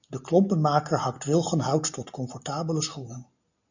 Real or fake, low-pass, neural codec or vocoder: real; 7.2 kHz; none